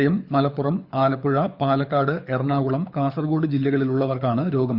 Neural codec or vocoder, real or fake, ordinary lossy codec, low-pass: codec, 24 kHz, 6 kbps, HILCodec; fake; Opus, 64 kbps; 5.4 kHz